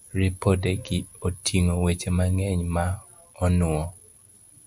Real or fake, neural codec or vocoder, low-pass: real; none; 10.8 kHz